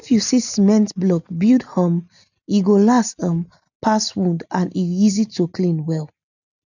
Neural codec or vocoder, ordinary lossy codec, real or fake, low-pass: none; none; real; 7.2 kHz